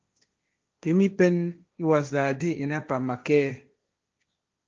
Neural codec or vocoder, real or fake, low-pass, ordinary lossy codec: codec, 16 kHz, 1.1 kbps, Voila-Tokenizer; fake; 7.2 kHz; Opus, 24 kbps